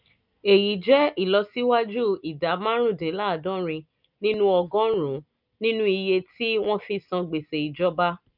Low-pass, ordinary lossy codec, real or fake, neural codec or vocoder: 5.4 kHz; none; real; none